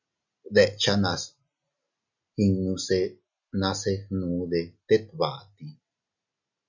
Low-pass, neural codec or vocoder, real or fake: 7.2 kHz; none; real